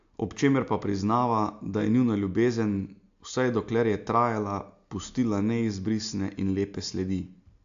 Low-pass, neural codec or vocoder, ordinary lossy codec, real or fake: 7.2 kHz; none; AAC, 64 kbps; real